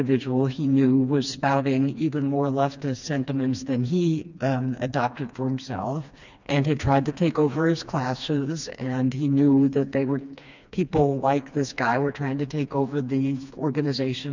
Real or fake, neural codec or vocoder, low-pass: fake; codec, 16 kHz, 2 kbps, FreqCodec, smaller model; 7.2 kHz